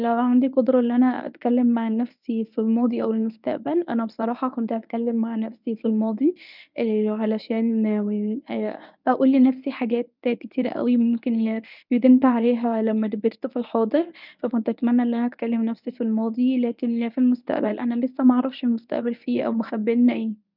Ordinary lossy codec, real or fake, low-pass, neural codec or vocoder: none; fake; 5.4 kHz; codec, 24 kHz, 0.9 kbps, WavTokenizer, medium speech release version 1